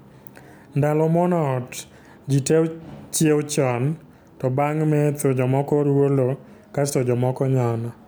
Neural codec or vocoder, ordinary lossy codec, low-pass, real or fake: none; none; none; real